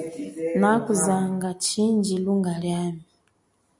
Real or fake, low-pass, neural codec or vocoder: real; 10.8 kHz; none